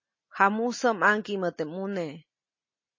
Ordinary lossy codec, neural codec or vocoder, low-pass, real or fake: MP3, 32 kbps; none; 7.2 kHz; real